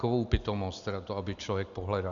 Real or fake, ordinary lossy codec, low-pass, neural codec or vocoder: real; Opus, 64 kbps; 7.2 kHz; none